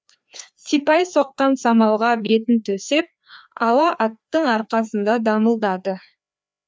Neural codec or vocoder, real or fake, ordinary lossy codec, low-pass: codec, 16 kHz, 2 kbps, FreqCodec, larger model; fake; none; none